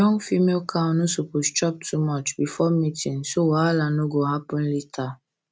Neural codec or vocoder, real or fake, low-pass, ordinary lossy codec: none; real; none; none